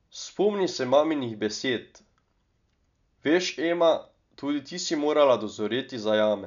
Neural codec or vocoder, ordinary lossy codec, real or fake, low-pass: none; none; real; 7.2 kHz